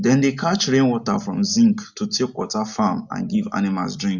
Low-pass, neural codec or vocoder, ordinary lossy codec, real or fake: 7.2 kHz; none; none; real